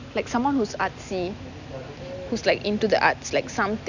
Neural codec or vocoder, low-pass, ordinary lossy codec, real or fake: none; 7.2 kHz; none; real